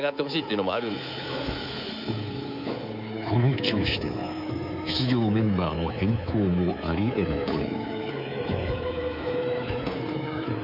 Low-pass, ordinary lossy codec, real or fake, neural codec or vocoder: 5.4 kHz; none; fake; codec, 24 kHz, 3.1 kbps, DualCodec